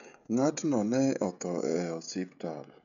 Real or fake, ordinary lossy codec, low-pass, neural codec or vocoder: fake; none; 7.2 kHz; codec, 16 kHz, 16 kbps, FreqCodec, smaller model